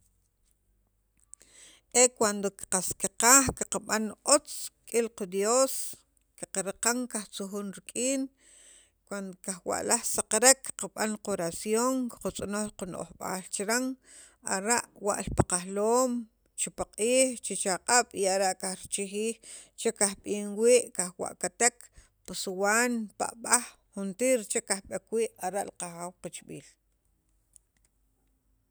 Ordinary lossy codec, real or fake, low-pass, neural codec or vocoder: none; real; none; none